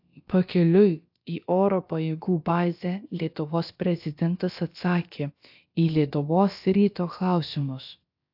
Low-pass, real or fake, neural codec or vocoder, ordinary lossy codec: 5.4 kHz; fake; codec, 16 kHz, about 1 kbps, DyCAST, with the encoder's durations; AAC, 48 kbps